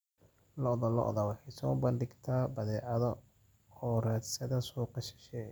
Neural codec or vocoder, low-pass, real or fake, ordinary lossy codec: none; none; real; none